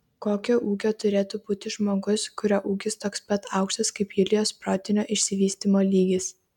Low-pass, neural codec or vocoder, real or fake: 19.8 kHz; none; real